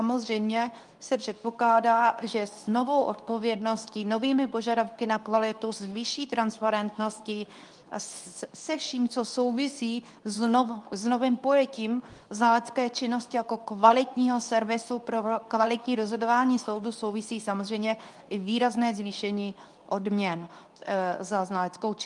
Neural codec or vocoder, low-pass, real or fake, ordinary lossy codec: codec, 24 kHz, 0.9 kbps, WavTokenizer, medium speech release version 2; 10.8 kHz; fake; Opus, 32 kbps